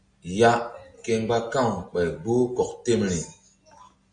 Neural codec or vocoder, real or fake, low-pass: none; real; 9.9 kHz